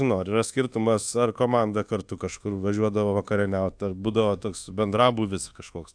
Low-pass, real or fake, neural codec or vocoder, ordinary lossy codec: 9.9 kHz; fake; codec, 24 kHz, 1.2 kbps, DualCodec; AAC, 64 kbps